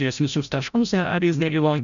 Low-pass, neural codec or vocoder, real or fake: 7.2 kHz; codec, 16 kHz, 0.5 kbps, FreqCodec, larger model; fake